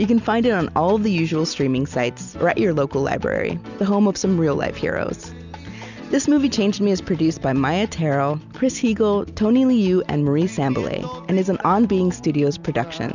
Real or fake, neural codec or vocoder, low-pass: real; none; 7.2 kHz